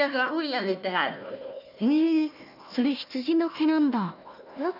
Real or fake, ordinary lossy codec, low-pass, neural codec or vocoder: fake; none; 5.4 kHz; codec, 16 kHz, 1 kbps, FunCodec, trained on Chinese and English, 50 frames a second